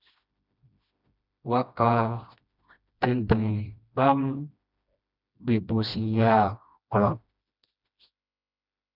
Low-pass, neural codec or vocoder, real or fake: 5.4 kHz; codec, 16 kHz, 1 kbps, FreqCodec, smaller model; fake